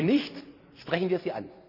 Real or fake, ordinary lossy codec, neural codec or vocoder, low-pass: fake; none; vocoder, 44.1 kHz, 128 mel bands every 256 samples, BigVGAN v2; 5.4 kHz